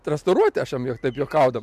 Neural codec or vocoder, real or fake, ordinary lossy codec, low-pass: none; real; MP3, 96 kbps; 14.4 kHz